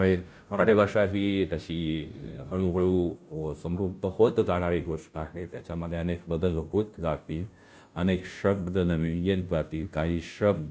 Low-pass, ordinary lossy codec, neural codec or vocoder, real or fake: none; none; codec, 16 kHz, 0.5 kbps, FunCodec, trained on Chinese and English, 25 frames a second; fake